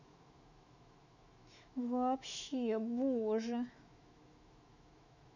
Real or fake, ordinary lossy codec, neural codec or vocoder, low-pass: fake; none; autoencoder, 48 kHz, 128 numbers a frame, DAC-VAE, trained on Japanese speech; 7.2 kHz